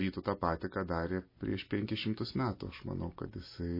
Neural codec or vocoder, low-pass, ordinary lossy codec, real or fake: none; 5.4 kHz; MP3, 24 kbps; real